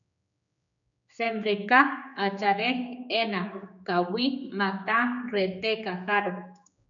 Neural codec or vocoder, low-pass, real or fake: codec, 16 kHz, 4 kbps, X-Codec, HuBERT features, trained on general audio; 7.2 kHz; fake